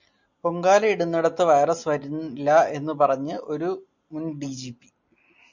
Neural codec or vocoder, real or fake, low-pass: none; real; 7.2 kHz